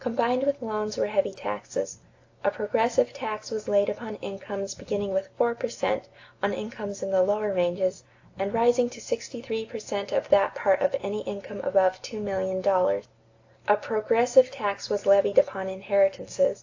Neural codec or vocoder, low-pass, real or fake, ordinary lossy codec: none; 7.2 kHz; real; AAC, 48 kbps